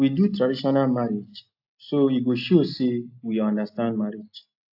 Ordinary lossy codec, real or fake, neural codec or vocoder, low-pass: AAC, 48 kbps; real; none; 5.4 kHz